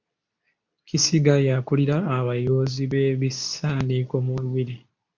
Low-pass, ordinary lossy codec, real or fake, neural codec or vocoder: 7.2 kHz; MP3, 64 kbps; fake; codec, 24 kHz, 0.9 kbps, WavTokenizer, medium speech release version 2